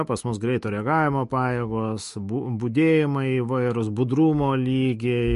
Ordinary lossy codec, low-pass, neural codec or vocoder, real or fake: MP3, 48 kbps; 14.4 kHz; autoencoder, 48 kHz, 128 numbers a frame, DAC-VAE, trained on Japanese speech; fake